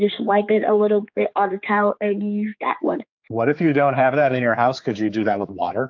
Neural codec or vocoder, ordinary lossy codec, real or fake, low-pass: codec, 16 kHz, 4 kbps, X-Codec, HuBERT features, trained on general audio; AAC, 48 kbps; fake; 7.2 kHz